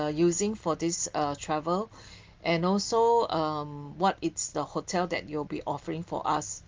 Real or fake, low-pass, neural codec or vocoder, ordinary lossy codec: real; 7.2 kHz; none; Opus, 32 kbps